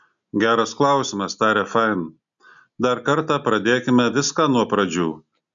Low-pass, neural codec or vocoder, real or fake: 7.2 kHz; none; real